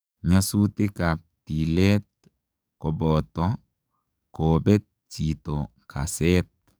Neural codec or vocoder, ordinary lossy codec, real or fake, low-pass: codec, 44.1 kHz, 7.8 kbps, DAC; none; fake; none